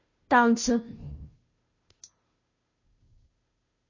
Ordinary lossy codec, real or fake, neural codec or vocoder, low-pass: MP3, 32 kbps; fake; codec, 16 kHz, 0.5 kbps, FunCodec, trained on Chinese and English, 25 frames a second; 7.2 kHz